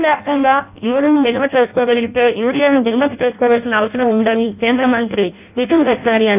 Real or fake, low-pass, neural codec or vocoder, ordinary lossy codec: fake; 3.6 kHz; codec, 16 kHz in and 24 kHz out, 0.6 kbps, FireRedTTS-2 codec; none